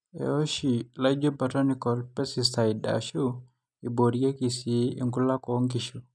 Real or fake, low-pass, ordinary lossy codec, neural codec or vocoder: real; none; none; none